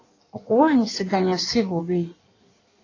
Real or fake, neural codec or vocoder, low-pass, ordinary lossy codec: fake; codec, 16 kHz in and 24 kHz out, 1.1 kbps, FireRedTTS-2 codec; 7.2 kHz; AAC, 32 kbps